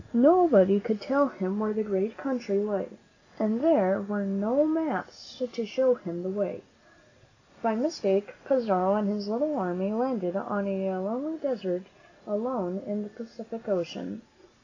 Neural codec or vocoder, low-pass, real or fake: none; 7.2 kHz; real